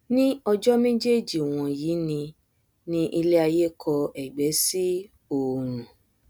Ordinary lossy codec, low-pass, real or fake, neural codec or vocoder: none; none; real; none